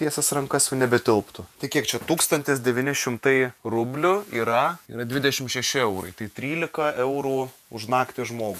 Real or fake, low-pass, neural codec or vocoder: fake; 14.4 kHz; vocoder, 48 kHz, 128 mel bands, Vocos